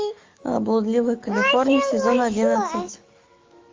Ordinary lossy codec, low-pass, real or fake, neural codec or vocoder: Opus, 32 kbps; 7.2 kHz; fake; autoencoder, 48 kHz, 128 numbers a frame, DAC-VAE, trained on Japanese speech